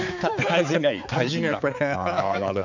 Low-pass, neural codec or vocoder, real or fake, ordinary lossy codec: 7.2 kHz; codec, 16 kHz, 4 kbps, X-Codec, HuBERT features, trained on balanced general audio; fake; none